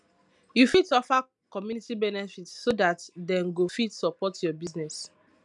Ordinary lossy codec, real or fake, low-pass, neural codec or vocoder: MP3, 96 kbps; real; 10.8 kHz; none